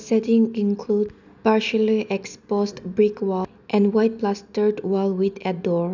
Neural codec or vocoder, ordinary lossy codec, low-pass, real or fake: none; none; 7.2 kHz; real